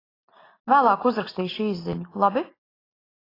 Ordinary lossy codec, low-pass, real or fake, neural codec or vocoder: AAC, 24 kbps; 5.4 kHz; real; none